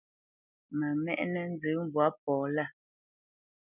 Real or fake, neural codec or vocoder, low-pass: real; none; 3.6 kHz